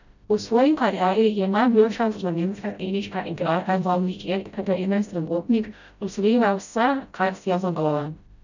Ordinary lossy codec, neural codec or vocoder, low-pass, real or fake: none; codec, 16 kHz, 0.5 kbps, FreqCodec, smaller model; 7.2 kHz; fake